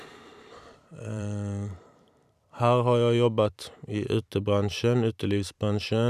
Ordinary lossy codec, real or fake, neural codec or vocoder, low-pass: none; fake; vocoder, 44.1 kHz, 128 mel bands every 512 samples, BigVGAN v2; 14.4 kHz